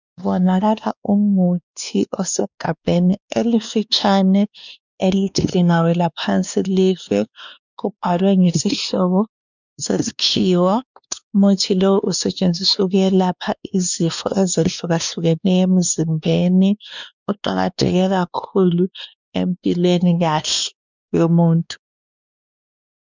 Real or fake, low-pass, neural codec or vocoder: fake; 7.2 kHz; codec, 16 kHz, 2 kbps, X-Codec, WavLM features, trained on Multilingual LibriSpeech